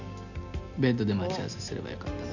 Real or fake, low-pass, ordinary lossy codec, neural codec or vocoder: real; 7.2 kHz; none; none